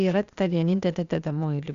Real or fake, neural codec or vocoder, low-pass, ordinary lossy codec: fake; codec, 16 kHz, 0.8 kbps, ZipCodec; 7.2 kHz; Opus, 64 kbps